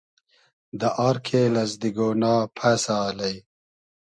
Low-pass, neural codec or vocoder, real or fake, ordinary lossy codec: 9.9 kHz; none; real; MP3, 64 kbps